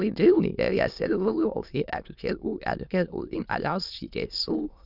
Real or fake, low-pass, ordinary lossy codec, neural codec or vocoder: fake; 5.4 kHz; none; autoencoder, 22.05 kHz, a latent of 192 numbers a frame, VITS, trained on many speakers